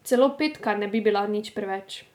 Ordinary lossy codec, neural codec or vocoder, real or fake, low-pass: none; none; real; 19.8 kHz